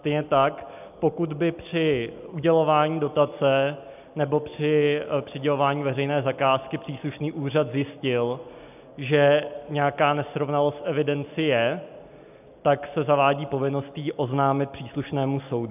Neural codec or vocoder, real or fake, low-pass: none; real; 3.6 kHz